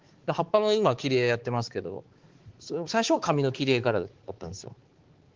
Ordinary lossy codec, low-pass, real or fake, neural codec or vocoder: Opus, 16 kbps; 7.2 kHz; fake; codec, 16 kHz, 4 kbps, X-Codec, HuBERT features, trained on balanced general audio